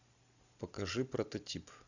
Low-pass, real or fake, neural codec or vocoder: 7.2 kHz; real; none